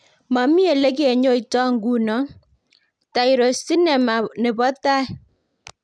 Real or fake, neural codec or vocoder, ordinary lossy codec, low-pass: real; none; none; 9.9 kHz